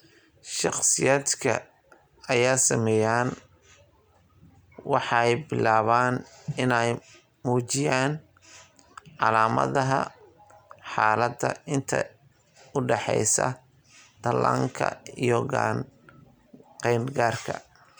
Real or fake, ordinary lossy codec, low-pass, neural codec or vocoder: real; none; none; none